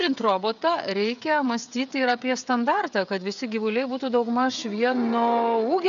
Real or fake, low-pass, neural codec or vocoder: fake; 7.2 kHz; codec, 16 kHz, 16 kbps, FreqCodec, smaller model